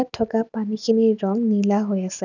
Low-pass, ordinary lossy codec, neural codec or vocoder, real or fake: 7.2 kHz; none; none; real